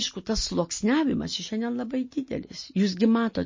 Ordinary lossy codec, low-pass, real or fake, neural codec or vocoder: MP3, 32 kbps; 7.2 kHz; real; none